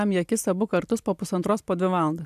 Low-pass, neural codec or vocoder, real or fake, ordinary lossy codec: 14.4 kHz; none; real; AAC, 96 kbps